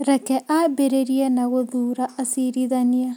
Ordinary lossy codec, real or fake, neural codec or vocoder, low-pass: none; real; none; none